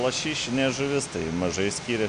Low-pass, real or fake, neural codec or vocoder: 9.9 kHz; real; none